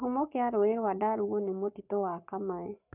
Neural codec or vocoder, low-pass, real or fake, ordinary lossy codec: codec, 44.1 kHz, 7.8 kbps, Pupu-Codec; 3.6 kHz; fake; none